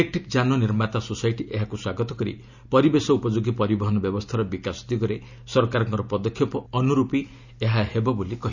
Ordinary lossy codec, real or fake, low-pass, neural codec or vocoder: none; real; 7.2 kHz; none